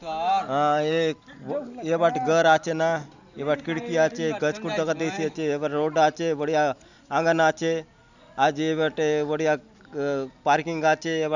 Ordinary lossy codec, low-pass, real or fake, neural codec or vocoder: none; 7.2 kHz; real; none